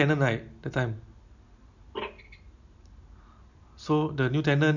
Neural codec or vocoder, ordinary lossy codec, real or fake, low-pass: none; none; real; 7.2 kHz